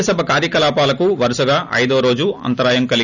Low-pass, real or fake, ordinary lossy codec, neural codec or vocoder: 7.2 kHz; real; none; none